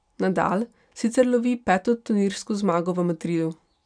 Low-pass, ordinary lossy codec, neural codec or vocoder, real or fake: 9.9 kHz; none; none; real